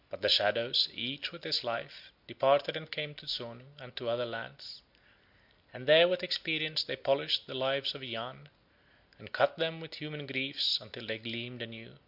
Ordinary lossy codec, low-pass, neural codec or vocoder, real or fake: MP3, 48 kbps; 5.4 kHz; none; real